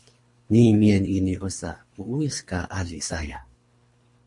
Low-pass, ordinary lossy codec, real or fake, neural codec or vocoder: 10.8 kHz; MP3, 48 kbps; fake; codec, 24 kHz, 3 kbps, HILCodec